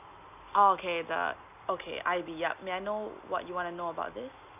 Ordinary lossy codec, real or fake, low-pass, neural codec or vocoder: AAC, 32 kbps; real; 3.6 kHz; none